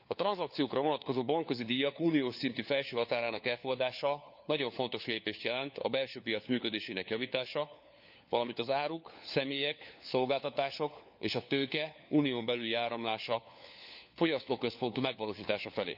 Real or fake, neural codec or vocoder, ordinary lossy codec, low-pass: fake; codec, 16 kHz, 4 kbps, FunCodec, trained on LibriTTS, 50 frames a second; none; 5.4 kHz